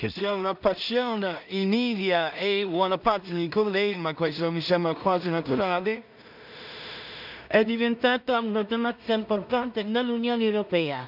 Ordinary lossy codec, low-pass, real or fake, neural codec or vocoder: none; 5.4 kHz; fake; codec, 16 kHz in and 24 kHz out, 0.4 kbps, LongCat-Audio-Codec, two codebook decoder